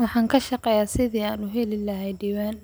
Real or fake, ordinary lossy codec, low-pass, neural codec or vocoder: real; none; none; none